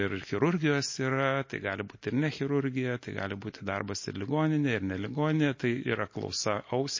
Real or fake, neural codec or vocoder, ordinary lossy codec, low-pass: real; none; MP3, 32 kbps; 7.2 kHz